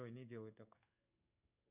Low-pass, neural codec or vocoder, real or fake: 3.6 kHz; codec, 16 kHz in and 24 kHz out, 1 kbps, XY-Tokenizer; fake